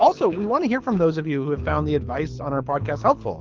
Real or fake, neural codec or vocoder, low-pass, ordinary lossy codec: fake; codec, 24 kHz, 6 kbps, HILCodec; 7.2 kHz; Opus, 32 kbps